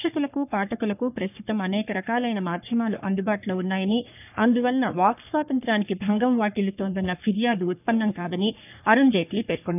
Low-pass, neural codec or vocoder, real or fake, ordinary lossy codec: 3.6 kHz; codec, 44.1 kHz, 3.4 kbps, Pupu-Codec; fake; none